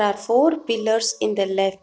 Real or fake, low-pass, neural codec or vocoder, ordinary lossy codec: real; none; none; none